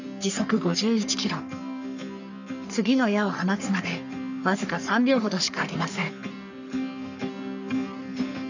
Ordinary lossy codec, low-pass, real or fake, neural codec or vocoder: none; 7.2 kHz; fake; codec, 44.1 kHz, 3.4 kbps, Pupu-Codec